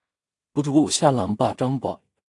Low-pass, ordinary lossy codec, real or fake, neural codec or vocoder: 10.8 kHz; AAC, 48 kbps; fake; codec, 16 kHz in and 24 kHz out, 0.4 kbps, LongCat-Audio-Codec, two codebook decoder